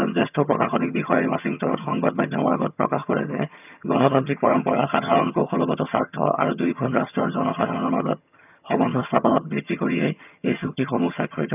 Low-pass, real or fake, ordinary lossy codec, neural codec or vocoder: 3.6 kHz; fake; none; vocoder, 22.05 kHz, 80 mel bands, HiFi-GAN